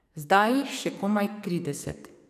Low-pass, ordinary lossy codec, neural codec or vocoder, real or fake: 14.4 kHz; none; codec, 44.1 kHz, 2.6 kbps, SNAC; fake